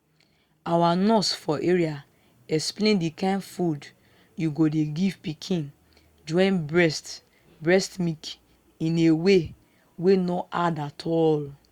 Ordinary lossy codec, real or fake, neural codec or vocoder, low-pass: Opus, 64 kbps; real; none; 19.8 kHz